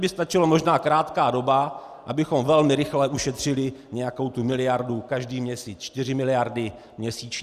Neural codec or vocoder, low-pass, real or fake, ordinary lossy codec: none; 14.4 kHz; real; Opus, 64 kbps